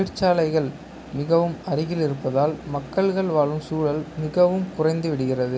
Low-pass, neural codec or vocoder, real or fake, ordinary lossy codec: none; none; real; none